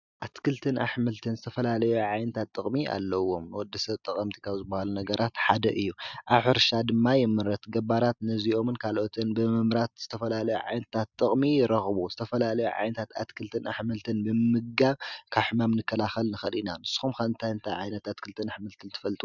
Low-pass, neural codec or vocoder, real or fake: 7.2 kHz; none; real